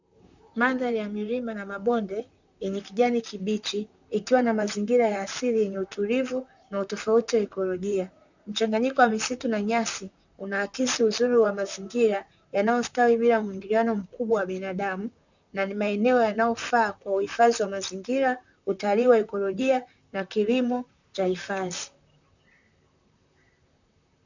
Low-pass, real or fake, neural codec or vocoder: 7.2 kHz; fake; vocoder, 44.1 kHz, 128 mel bands, Pupu-Vocoder